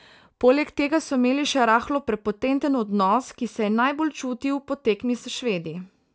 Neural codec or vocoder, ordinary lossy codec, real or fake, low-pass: none; none; real; none